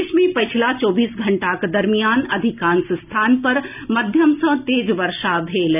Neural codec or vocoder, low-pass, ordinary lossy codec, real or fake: none; 3.6 kHz; none; real